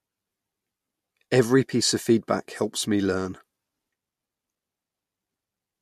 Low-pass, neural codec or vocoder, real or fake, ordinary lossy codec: 14.4 kHz; none; real; MP3, 64 kbps